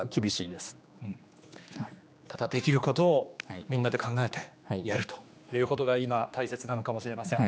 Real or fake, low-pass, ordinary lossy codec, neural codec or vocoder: fake; none; none; codec, 16 kHz, 2 kbps, X-Codec, HuBERT features, trained on general audio